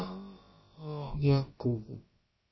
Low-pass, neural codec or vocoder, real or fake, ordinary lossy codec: 7.2 kHz; codec, 16 kHz, about 1 kbps, DyCAST, with the encoder's durations; fake; MP3, 24 kbps